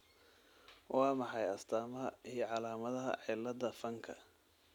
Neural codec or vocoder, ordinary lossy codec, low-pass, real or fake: none; none; 19.8 kHz; real